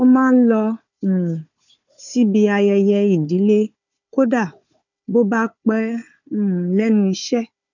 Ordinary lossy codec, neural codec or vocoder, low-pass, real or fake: none; codec, 16 kHz, 4 kbps, FunCodec, trained on Chinese and English, 50 frames a second; 7.2 kHz; fake